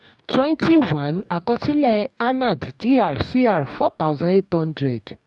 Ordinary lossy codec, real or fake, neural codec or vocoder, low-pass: none; fake; codec, 44.1 kHz, 2.6 kbps, DAC; 10.8 kHz